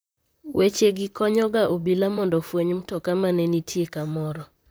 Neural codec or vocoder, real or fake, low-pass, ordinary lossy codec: vocoder, 44.1 kHz, 128 mel bands, Pupu-Vocoder; fake; none; none